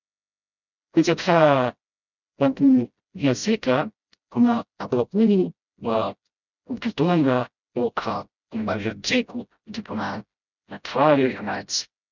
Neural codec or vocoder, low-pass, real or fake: codec, 16 kHz, 0.5 kbps, FreqCodec, smaller model; 7.2 kHz; fake